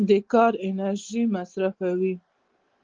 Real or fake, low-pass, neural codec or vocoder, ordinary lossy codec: real; 7.2 kHz; none; Opus, 16 kbps